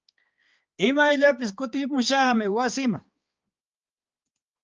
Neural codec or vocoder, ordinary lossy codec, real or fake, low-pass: codec, 16 kHz, 2 kbps, X-Codec, HuBERT features, trained on balanced general audio; Opus, 24 kbps; fake; 7.2 kHz